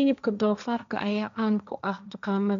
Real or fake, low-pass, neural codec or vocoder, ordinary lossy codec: fake; 7.2 kHz; codec, 16 kHz, 1.1 kbps, Voila-Tokenizer; MP3, 64 kbps